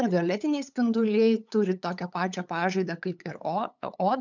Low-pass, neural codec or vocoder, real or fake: 7.2 kHz; codec, 16 kHz, 8 kbps, FunCodec, trained on LibriTTS, 25 frames a second; fake